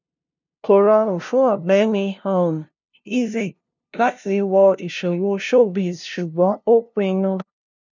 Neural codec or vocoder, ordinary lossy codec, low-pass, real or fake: codec, 16 kHz, 0.5 kbps, FunCodec, trained on LibriTTS, 25 frames a second; none; 7.2 kHz; fake